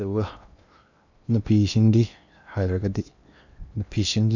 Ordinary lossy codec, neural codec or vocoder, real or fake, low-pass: none; codec, 16 kHz in and 24 kHz out, 0.8 kbps, FocalCodec, streaming, 65536 codes; fake; 7.2 kHz